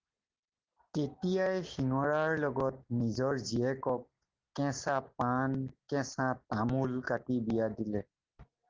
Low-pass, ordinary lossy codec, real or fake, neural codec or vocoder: 7.2 kHz; Opus, 16 kbps; real; none